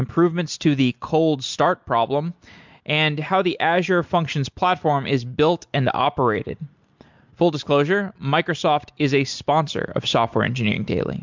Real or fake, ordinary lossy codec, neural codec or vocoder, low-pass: real; MP3, 64 kbps; none; 7.2 kHz